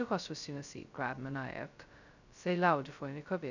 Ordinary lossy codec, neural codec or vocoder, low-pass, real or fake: none; codec, 16 kHz, 0.2 kbps, FocalCodec; 7.2 kHz; fake